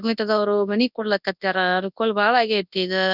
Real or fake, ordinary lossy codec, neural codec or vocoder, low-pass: fake; none; codec, 24 kHz, 0.9 kbps, WavTokenizer, large speech release; 5.4 kHz